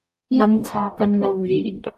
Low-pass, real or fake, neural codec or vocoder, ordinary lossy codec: 14.4 kHz; fake; codec, 44.1 kHz, 0.9 kbps, DAC; AAC, 96 kbps